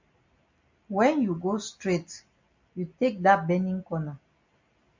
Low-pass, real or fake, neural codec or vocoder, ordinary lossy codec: 7.2 kHz; real; none; MP3, 64 kbps